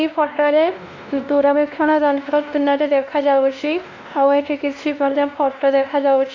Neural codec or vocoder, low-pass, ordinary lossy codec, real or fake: codec, 16 kHz, 1 kbps, X-Codec, WavLM features, trained on Multilingual LibriSpeech; 7.2 kHz; AAC, 48 kbps; fake